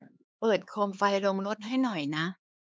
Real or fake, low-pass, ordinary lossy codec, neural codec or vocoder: fake; none; none; codec, 16 kHz, 4 kbps, X-Codec, HuBERT features, trained on LibriSpeech